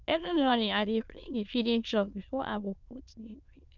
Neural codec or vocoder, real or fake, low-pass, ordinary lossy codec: autoencoder, 22.05 kHz, a latent of 192 numbers a frame, VITS, trained on many speakers; fake; 7.2 kHz; none